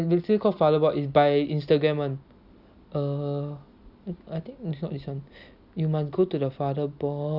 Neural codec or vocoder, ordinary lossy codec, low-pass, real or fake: none; none; 5.4 kHz; real